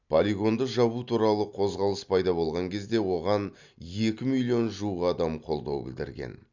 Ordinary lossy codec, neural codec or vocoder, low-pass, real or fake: none; none; 7.2 kHz; real